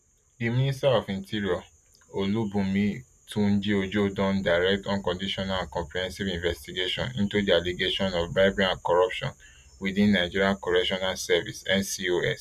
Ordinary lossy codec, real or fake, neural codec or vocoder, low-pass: none; real; none; 14.4 kHz